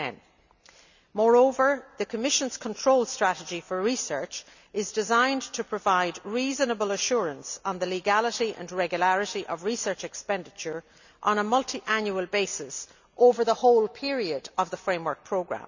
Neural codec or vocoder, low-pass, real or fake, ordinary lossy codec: none; 7.2 kHz; real; none